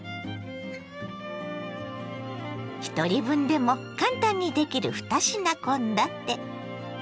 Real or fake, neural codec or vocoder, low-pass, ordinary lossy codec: real; none; none; none